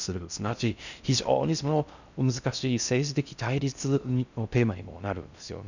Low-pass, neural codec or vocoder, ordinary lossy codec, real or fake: 7.2 kHz; codec, 16 kHz in and 24 kHz out, 0.6 kbps, FocalCodec, streaming, 4096 codes; none; fake